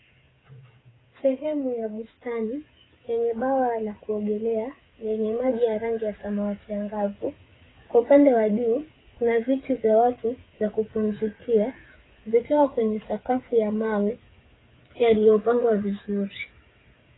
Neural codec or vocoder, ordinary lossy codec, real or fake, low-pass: codec, 16 kHz, 8 kbps, FreqCodec, smaller model; AAC, 16 kbps; fake; 7.2 kHz